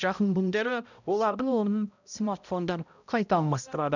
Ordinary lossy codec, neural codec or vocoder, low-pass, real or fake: none; codec, 16 kHz, 0.5 kbps, X-Codec, HuBERT features, trained on balanced general audio; 7.2 kHz; fake